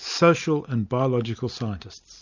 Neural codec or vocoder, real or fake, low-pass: none; real; 7.2 kHz